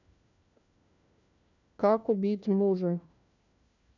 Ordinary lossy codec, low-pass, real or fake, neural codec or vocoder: none; 7.2 kHz; fake; codec, 16 kHz, 1 kbps, FunCodec, trained on LibriTTS, 50 frames a second